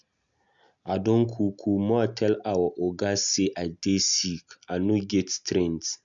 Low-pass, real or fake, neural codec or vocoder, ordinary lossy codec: 7.2 kHz; real; none; none